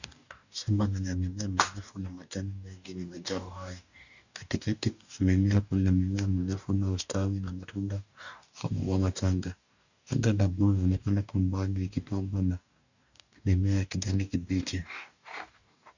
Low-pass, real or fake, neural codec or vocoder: 7.2 kHz; fake; codec, 44.1 kHz, 2.6 kbps, DAC